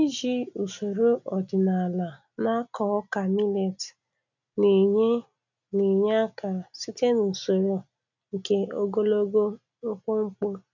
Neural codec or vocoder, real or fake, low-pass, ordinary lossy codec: none; real; 7.2 kHz; none